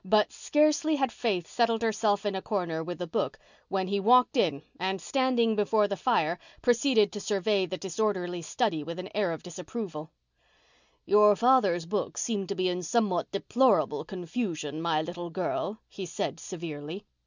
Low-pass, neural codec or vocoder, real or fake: 7.2 kHz; none; real